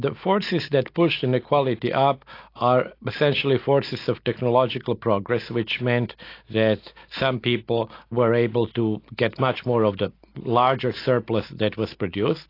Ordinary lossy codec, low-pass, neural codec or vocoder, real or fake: AAC, 32 kbps; 5.4 kHz; none; real